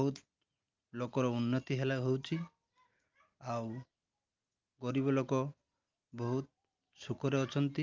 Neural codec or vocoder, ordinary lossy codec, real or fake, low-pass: none; Opus, 32 kbps; real; 7.2 kHz